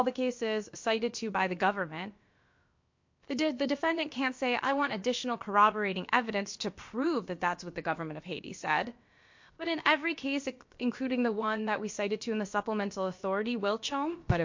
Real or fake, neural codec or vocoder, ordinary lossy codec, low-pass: fake; codec, 16 kHz, about 1 kbps, DyCAST, with the encoder's durations; MP3, 48 kbps; 7.2 kHz